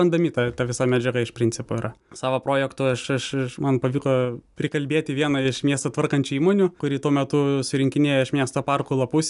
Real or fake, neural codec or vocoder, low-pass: real; none; 10.8 kHz